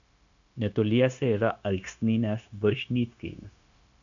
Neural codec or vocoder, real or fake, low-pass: codec, 16 kHz, 0.9 kbps, LongCat-Audio-Codec; fake; 7.2 kHz